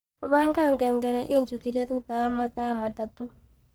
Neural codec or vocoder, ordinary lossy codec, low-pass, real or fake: codec, 44.1 kHz, 1.7 kbps, Pupu-Codec; none; none; fake